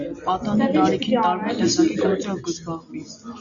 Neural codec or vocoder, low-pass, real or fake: none; 7.2 kHz; real